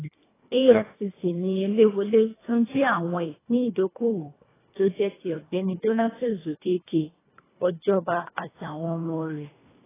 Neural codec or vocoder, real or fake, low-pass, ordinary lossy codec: codec, 24 kHz, 1.5 kbps, HILCodec; fake; 3.6 kHz; AAC, 16 kbps